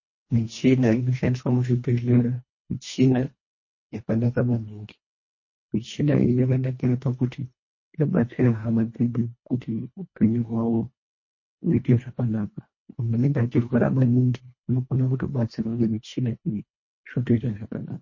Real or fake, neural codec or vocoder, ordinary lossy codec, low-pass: fake; codec, 24 kHz, 1.5 kbps, HILCodec; MP3, 32 kbps; 7.2 kHz